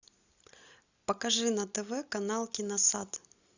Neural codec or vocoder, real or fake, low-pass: none; real; 7.2 kHz